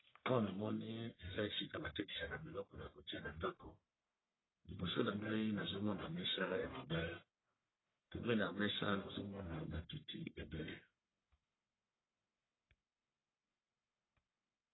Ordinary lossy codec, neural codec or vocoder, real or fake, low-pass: AAC, 16 kbps; codec, 44.1 kHz, 1.7 kbps, Pupu-Codec; fake; 7.2 kHz